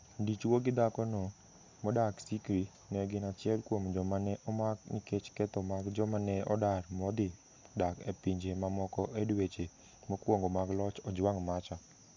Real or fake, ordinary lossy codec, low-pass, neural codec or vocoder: real; MP3, 64 kbps; 7.2 kHz; none